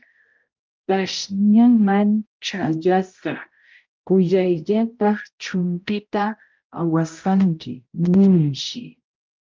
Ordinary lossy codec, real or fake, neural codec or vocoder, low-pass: Opus, 24 kbps; fake; codec, 16 kHz, 0.5 kbps, X-Codec, HuBERT features, trained on balanced general audio; 7.2 kHz